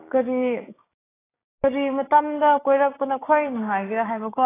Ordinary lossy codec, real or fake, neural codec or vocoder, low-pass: AAC, 16 kbps; fake; codec, 16 kHz, 6 kbps, DAC; 3.6 kHz